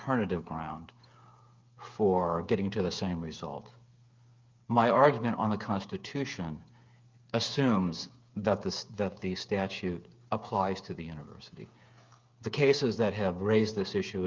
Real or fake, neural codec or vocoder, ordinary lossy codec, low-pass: fake; codec, 16 kHz, 8 kbps, FreqCodec, smaller model; Opus, 24 kbps; 7.2 kHz